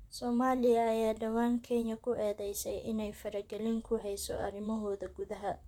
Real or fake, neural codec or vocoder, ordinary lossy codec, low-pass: fake; vocoder, 44.1 kHz, 128 mel bands, Pupu-Vocoder; none; 19.8 kHz